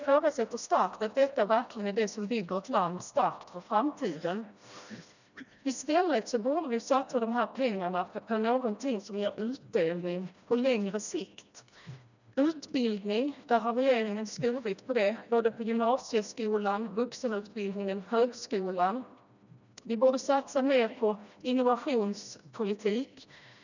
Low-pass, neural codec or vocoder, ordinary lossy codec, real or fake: 7.2 kHz; codec, 16 kHz, 1 kbps, FreqCodec, smaller model; none; fake